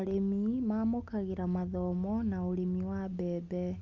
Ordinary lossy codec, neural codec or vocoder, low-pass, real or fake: Opus, 32 kbps; none; 7.2 kHz; real